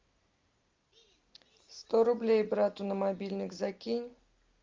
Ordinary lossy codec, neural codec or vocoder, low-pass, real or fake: Opus, 32 kbps; none; 7.2 kHz; real